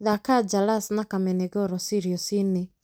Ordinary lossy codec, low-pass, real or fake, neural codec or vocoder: none; none; real; none